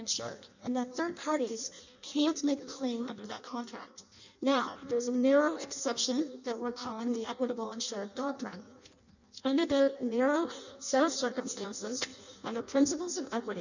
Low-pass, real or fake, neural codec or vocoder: 7.2 kHz; fake; codec, 16 kHz in and 24 kHz out, 0.6 kbps, FireRedTTS-2 codec